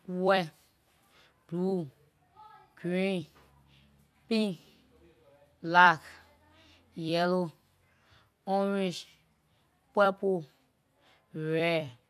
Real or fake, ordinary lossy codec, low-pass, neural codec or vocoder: fake; none; 14.4 kHz; vocoder, 44.1 kHz, 128 mel bands every 256 samples, BigVGAN v2